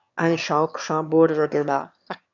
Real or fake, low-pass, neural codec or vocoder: fake; 7.2 kHz; autoencoder, 22.05 kHz, a latent of 192 numbers a frame, VITS, trained on one speaker